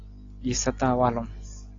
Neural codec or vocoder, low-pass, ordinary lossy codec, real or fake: none; 7.2 kHz; AAC, 32 kbps; real